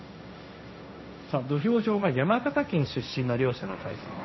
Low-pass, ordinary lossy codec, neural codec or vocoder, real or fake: 7.2 kHz; MP3, 24 kbps; codec, 16 kHz, 1.1 kbps, Voila-Tokenizer; fake